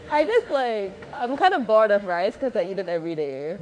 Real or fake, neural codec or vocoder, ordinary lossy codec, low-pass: fake; autoencoder, 48 kHz, 32 numbers a frame, DAC-VAE, trained on Japanese speech; none; 9.9 kHz